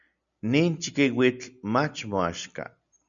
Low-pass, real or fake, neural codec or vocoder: 7.2 kHz; real; none